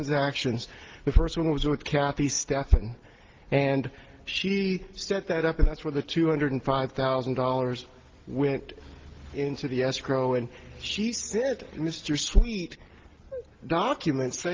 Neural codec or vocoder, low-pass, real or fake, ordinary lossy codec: none; 7.2 kHz; real; Opus, 16 kbps